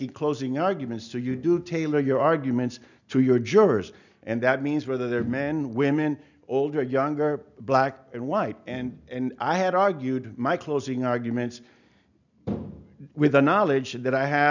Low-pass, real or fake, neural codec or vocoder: 7.2 kHz; real; none